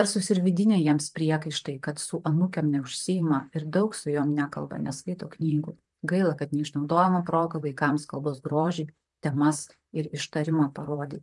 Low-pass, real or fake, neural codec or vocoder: 10.8 kHz; fake; vocoder, 44.1 kHz, 128 mel bands, Pupu-Vocoder